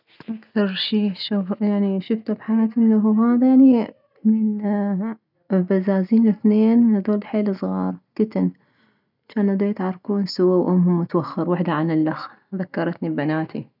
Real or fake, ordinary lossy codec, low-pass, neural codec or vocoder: real; none; 5.4 kHz; none